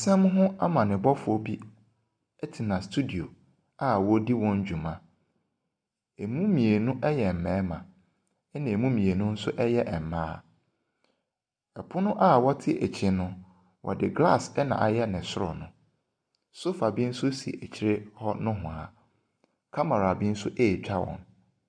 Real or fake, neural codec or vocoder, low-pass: real; none; 9.9 kHz